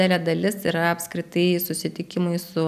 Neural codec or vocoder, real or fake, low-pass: none; real; 14.4 kHz